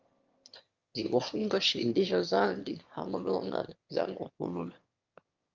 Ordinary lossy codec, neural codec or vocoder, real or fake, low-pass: Opus, 32 kbps; autoencoder, 22.05 kHz, a latent of 192 numbers a frame, VITS, trained on one speaker; fake; 7.2 kHz